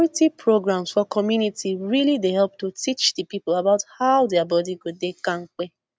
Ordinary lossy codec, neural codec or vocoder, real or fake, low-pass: none; none; real; none